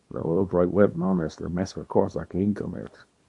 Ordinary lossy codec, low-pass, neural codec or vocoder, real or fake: MP3, 48 kbps; 10.8 kHz; codec, 24 kHz, 0.9 kbps, WavTokenizer, small release; fake